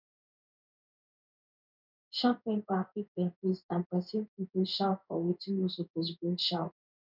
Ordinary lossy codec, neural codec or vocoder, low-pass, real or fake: none; codec, 16 kHz in and 24 kHz out, 1 kbps, XY-Tokenizer; 5.4 kHz; fake